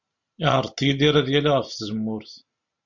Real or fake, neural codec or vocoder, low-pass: real; none; 7.2 kHz